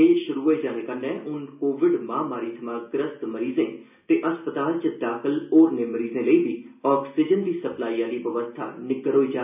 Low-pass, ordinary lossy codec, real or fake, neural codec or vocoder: 3.6 kHz; none; real; none